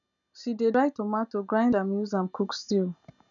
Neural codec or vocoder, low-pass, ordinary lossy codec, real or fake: none; 7.2 kHz; none; real